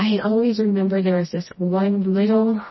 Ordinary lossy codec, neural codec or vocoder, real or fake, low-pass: MP3, 24 kbps; codec, 16 kHz, 1 kbps, FreqCodec, smaller model; fake; 7.2 kHz